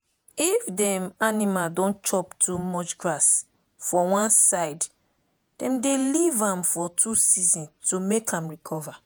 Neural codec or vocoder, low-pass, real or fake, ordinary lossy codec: vocoder, 48 kHz, 128 mel bands, Vocos; none; fake; none